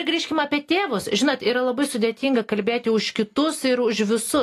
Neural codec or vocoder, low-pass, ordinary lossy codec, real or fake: none; 14.4 kHz; AAC, 48 kbps; real